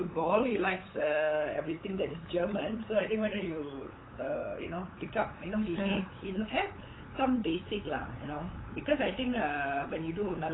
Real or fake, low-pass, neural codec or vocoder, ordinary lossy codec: fake; 7.2 kHz; codec, 16 kHz, 8 kbps, FunCodec, trained on LibriTTS, 25 frames a second; AAC, 16 kbps